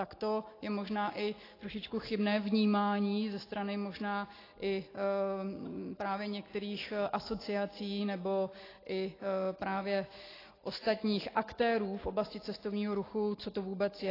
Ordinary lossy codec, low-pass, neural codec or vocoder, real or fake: AAC, 24 kbps; 5.4 kHz; none; real